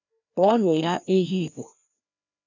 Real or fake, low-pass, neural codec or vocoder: fake; 7.2 kHz; codec, 16 kHz, 1 kbps, FreqCodec, larger model